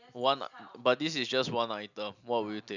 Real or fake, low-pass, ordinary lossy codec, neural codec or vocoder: real; 7.2 kHz; none; none